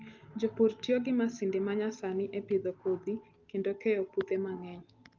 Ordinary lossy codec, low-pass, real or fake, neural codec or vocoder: Opus, 32 kbps; 7.2 kHz; real; none